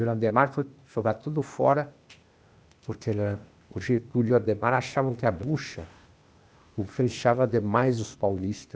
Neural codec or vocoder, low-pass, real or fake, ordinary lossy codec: codec, 16 kHz, 0.8 kbps, ZipCodec; none; fake; none